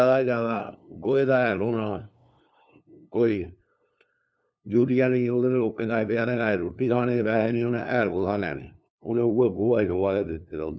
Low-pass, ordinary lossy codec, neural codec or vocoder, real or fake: none; none; codec, 16 kHz, 2 kbps, FunCodec, trained on LibriTTS, 25 frames a second; fake